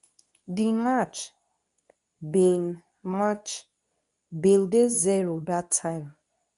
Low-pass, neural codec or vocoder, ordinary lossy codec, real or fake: 10.8 kHz; codec, 24 kHz, 0.9 kbps, WavTokenizer, medium speech release version 2; none; fake